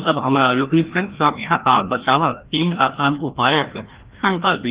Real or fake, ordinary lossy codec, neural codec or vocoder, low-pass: fake; Opus, 16 kbps; codec, 16 kHz, 1 kbps, FreqCodec, larger model; 3.6 kHz